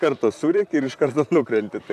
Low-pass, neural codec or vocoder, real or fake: 14.4 kHz; vocoder, 44.1 kHz, 128 mel bands, Pupu-Vocoder; fake